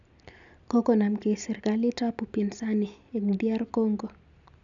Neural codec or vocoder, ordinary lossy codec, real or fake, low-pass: none; none; real; 7.2 kHz